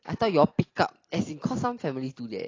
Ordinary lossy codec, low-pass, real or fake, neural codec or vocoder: AAC, 32 kbps; 7.2 kHz; real; none